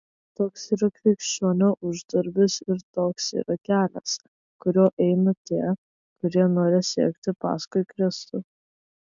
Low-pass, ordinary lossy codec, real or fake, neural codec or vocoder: 7.2 kHz; MP3, 64 kbps; real; none